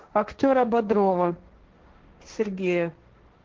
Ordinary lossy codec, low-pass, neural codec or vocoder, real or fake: Opus, 16 kbps; 7.2 kHz; codec, 16 kHz, 1.1 kbps, Voila-Tokenizer; fake